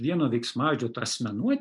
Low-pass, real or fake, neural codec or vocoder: 10.8 kHz; real; none